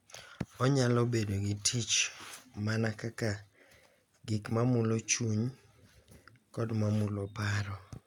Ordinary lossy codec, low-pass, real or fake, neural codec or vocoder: none; 19.8 kHz; real; none